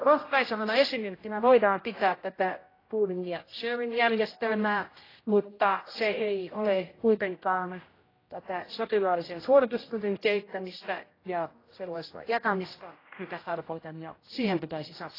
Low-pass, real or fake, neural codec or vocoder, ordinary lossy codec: 5.4 kHz; fake; codec, 16 kHz, 0.5 kbps, X-Codec, HuBERT features, trained on general audio; AAC, 24 kbps